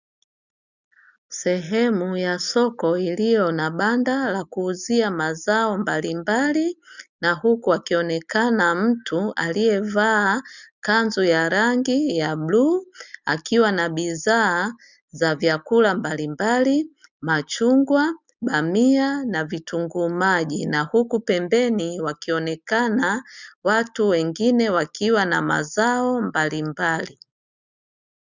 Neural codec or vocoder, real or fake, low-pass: none; real; 7.2 kHz